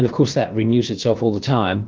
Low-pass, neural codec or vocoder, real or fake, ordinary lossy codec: 7.2 kHz; codec, 16 kHz, about 1 kbps, DyCAST, with the encoder's durations; fake; Opus, 16 kbps